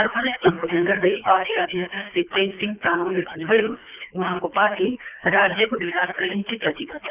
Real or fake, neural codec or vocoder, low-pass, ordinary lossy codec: fake; codec, 24 kHz, 3 kbps, HILCodec; 3.6 kHz; none